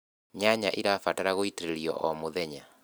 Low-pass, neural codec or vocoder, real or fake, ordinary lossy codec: none; none; real; none